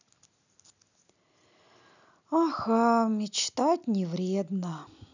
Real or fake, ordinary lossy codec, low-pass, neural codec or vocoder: real; none; 7.2 kHz; none